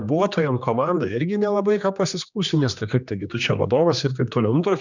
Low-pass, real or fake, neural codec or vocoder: 7.2 kHz; fake; codec, 16 kHz, 2 kbps, X-Codec, HuBERT features, trained on general audio